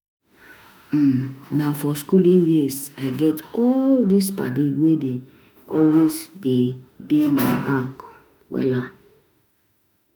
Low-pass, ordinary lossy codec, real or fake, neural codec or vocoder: none; none; fake; autoencoder, 48 kHz, 32 numbers a frame, DAC-VAE, trained on Japanese speech